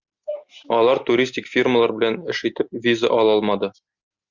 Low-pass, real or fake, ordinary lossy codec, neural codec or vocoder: 7.2 kHz; real; Opus, 64 kbps; none